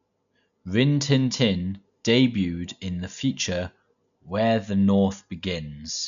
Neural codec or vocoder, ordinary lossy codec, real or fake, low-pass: none; none; real; 7.2 kHz